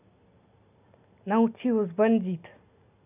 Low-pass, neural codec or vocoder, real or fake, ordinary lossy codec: 3.6 kHz; none; real; none